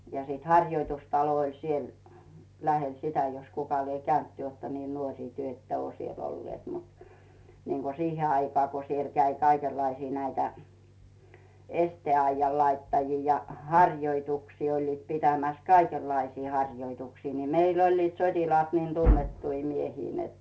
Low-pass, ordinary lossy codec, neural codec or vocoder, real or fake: none; none; none; real